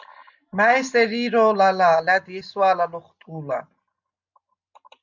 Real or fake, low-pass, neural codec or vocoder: real; 7.2 kHz; none